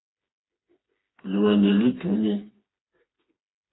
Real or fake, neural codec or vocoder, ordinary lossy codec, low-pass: fake; codec, 16 kHz, 4 kbps, FreqCodec, smaller model; AAC, 16 kbps; 7.2 kHz